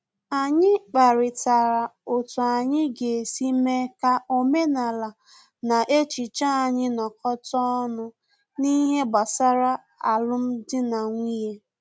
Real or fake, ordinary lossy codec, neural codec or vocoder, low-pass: real; none; none; none